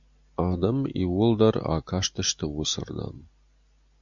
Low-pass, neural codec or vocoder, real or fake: 7.2 kHz; none; real